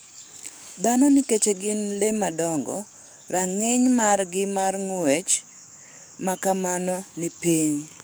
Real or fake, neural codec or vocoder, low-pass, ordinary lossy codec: fake; codec, 44.1 kHz, 7.8 kbps, DAC; none; none